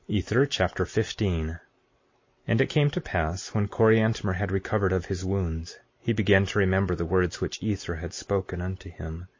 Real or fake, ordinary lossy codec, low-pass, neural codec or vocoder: real; MP3, 32 kbps; 7.2 kHz; none